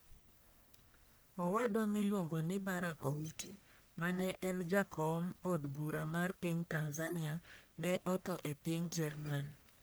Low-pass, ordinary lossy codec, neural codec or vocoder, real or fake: none; none; codec, 44.1 kHz, 1.7 kbps, Pupu-Codec; fake